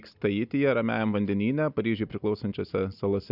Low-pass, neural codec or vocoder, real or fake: 5.4 kHz; none; real